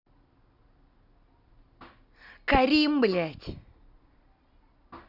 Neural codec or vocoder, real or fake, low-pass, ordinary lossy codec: none; real; 5.4 kHz; none